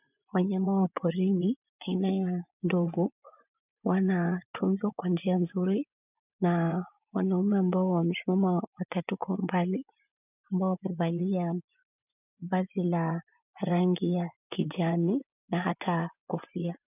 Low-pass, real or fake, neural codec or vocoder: 3.6 kHz; fake; vocoder, 24 kHz, 100 mel bands, Vocos